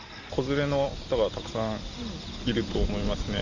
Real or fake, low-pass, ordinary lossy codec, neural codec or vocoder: real; 7.2 kHz; none; none